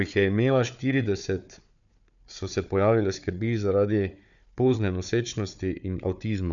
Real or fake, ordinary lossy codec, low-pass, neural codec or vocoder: fake; none; 7.2 kHz; codec, 16 kHz, 4 kbps, FunCodec, trained on Chinese and English, 50 frames a second